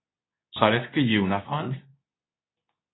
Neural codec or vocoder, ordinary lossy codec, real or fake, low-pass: codec, 24 kHz, 0.9 kbps, WavTokenizer, medium speech release version 2; AAC, 16 kbps; fake; 7.2 kHz